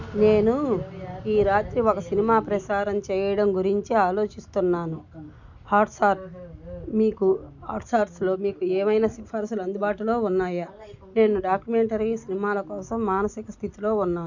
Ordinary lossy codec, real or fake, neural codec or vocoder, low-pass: none; real; none; 7.2 kHz